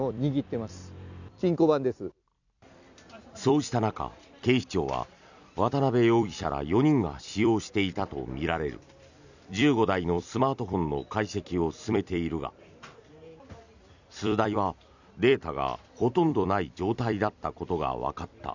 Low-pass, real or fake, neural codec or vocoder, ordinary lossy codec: 7.2 kHz; fake; vocoder, 44.1 kHz, 128 mel bands every 256 samples, BigVGAN v2; none